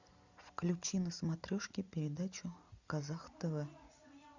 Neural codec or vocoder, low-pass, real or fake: none; 7.2 kHz; real